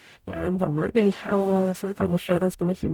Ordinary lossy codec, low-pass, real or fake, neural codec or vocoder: none; 19.8 kHz; fake; codec, 44.1 kHz, 0.9 kbps, DAC